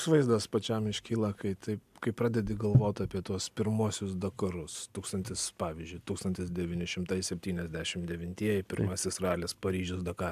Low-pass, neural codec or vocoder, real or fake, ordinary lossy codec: 14.4 kHz; none; real; AAC, 96 kbps